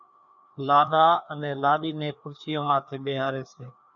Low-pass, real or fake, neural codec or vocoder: 7.2 kHz; fake; codec, 16 kHz, 2 kbps, FreqCodec, larger model